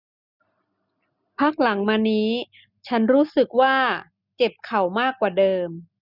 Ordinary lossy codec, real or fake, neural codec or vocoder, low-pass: none; real; none; 5.4 kHz